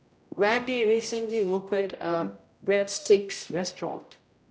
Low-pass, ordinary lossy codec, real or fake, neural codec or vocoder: none; none; fake; codec, 16 kHz, 0.5 kbps, X-Codec, HuBERT features, trained on general audio